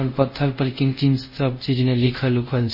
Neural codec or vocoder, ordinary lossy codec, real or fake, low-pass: codec, 24 kHz, 0.5 kbps, DualCodec; MP3, 24 kbps; fake; 5.4 kHz